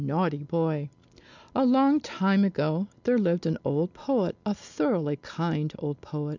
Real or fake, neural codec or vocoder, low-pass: real; none; 7.2 kHz